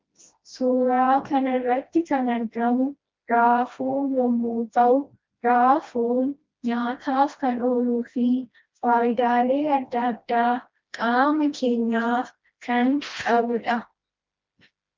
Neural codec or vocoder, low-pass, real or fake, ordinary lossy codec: codec, 16 kHz, 1 kbps, FreqCodec, smaller model; 7.2 kHz; fake; Opus, 32 kbps